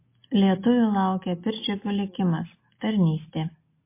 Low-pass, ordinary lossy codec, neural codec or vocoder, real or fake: 3.6 kHz; MP3, 24 kbps; none; real